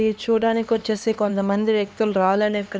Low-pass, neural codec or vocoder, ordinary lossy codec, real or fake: none; codec, 16 kHz, 2 kbps, X-Codec, HuBERT features, trained on LibriSpeech; none; fake